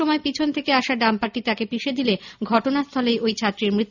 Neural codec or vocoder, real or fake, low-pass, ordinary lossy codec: none; real; 7.2 kHz; none